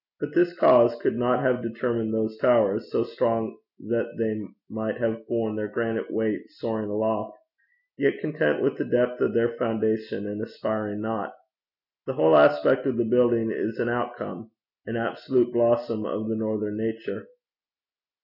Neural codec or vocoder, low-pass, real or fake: none; 5.4 kHz; real